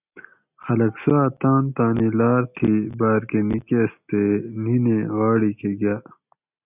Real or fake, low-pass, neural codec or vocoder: real; 3.6 kHz; none